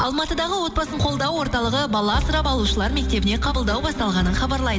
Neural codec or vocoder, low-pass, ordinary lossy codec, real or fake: none; none; none; real